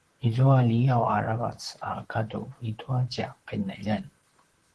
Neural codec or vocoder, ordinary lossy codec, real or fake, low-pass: vocoder, 44.1 kHz, 128 mel bands, Pupu-Vocoder; Opus, 16 kbps; fake; 10.8 kHz